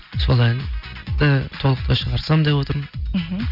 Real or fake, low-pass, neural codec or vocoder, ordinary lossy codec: real; 5.4 kHz; none; none